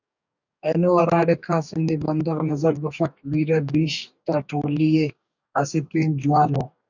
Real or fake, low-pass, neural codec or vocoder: fake; 7.2 kHz; codec, 44.1 kHz, 2.6 kbps, DAC